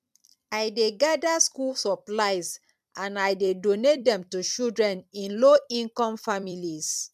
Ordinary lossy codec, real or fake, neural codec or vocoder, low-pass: MP3, 96 kbps; fake; vocoder, 44.1 kHz, 128 mel bands every 256 samples, BigVGAN v2; 14.4 kHz